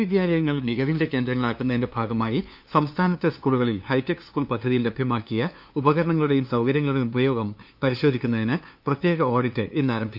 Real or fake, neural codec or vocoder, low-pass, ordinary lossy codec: fake; codec, 16 kHz, 2 kbps, FunCodec, trained on LibriTTS, 25 frames a second; 5.4 kHz; none